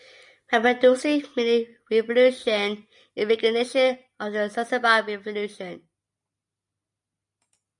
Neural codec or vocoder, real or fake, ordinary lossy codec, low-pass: none; real; MP3, 96 kbps; 10.8 kHz